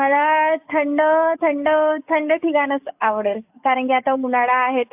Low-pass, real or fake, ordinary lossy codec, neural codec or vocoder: 3.6 kHz; fake; AAC, 32 kbps; codec, 44.1 kHz, 7.8 kbps, DAC